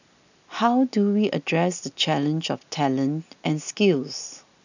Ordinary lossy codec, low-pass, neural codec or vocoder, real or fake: none; 7.2 kHz; none; real